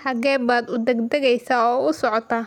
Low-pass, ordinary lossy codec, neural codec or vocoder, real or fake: 19.8 kHz; none; none; real